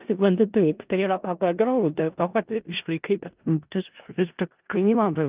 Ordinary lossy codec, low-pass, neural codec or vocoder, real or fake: Opus, 32 kbps; 3.6 kHz; codec, 16 kHz in and 24 kHz out, 0.4 kbps, LongCat-Audio-Codec, four codebook decoder; fake